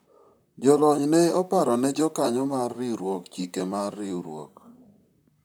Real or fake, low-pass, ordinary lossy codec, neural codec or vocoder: fake; none; none; vocoder, 44.1 kHz, 128 mel bands, Pupu-Vocoder